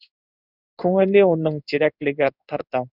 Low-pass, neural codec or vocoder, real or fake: 5.4 kHz; codec, 16 kHz in and 24 kHz out, 1 kbps, XY-Tokenizer; fake